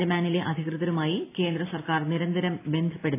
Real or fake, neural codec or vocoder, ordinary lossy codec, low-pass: real; none; AAC, 24 kbps; 3.6 kHz